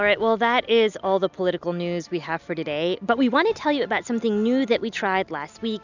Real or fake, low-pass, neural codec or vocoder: real; 7.2 kHz; none